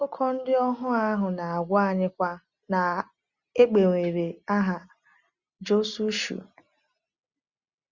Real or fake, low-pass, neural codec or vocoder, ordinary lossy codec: real; 7.2 kHz; none; Opus, 64 kbps